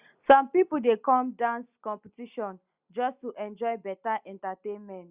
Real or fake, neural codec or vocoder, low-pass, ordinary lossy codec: real; none; 3.6 kHz; Opus, 64 kbps